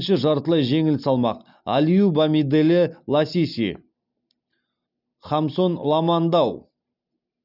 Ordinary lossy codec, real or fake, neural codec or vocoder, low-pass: none; real; none; 5.4 kHz